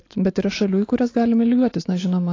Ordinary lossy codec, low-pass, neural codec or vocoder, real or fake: AAC, 32 kbps; 7.2 kHz; none; real